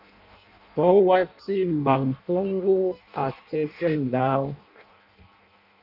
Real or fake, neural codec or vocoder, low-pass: fake; codec, 16 kHz in and 24 kHz out, 0.6 kbps, FireRedTTS-2 codec; 5.4 kHz